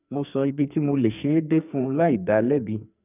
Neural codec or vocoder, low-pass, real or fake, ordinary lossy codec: codec, 44.1 kHz, 2.6 kbps, SNAC; 3.6 kHz; fake; none